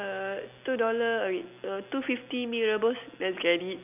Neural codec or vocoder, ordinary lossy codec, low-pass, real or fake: none; none; 3.6 kHz; real